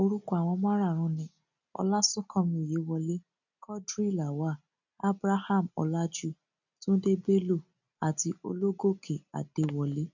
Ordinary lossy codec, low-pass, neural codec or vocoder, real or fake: none; 7.2 kHz; none; real